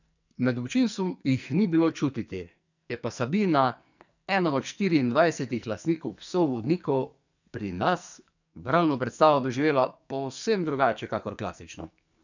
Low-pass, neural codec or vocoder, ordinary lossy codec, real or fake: 7.2 kHz; codec, 44.1 kHz, 2.6 kbps, SNAC; none; fake